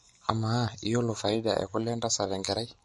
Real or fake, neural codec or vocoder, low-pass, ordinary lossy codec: real; none; 14.4 kHz; MP3, 48 kbps